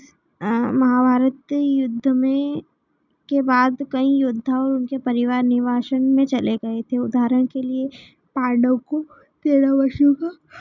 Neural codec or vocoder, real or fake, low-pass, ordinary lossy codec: none; real; 7.2 kHz; none